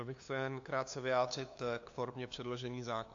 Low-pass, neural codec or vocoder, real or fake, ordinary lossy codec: 7.2 kHz; codec, 16 kHz, 2 kbps, FunCodec, trained on LibriTTS, 25 frames a second; fake; AAC, 48 kbps